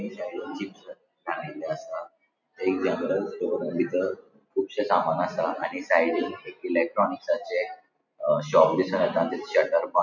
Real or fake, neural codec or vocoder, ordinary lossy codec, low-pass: real; none; none; 7.2 kHz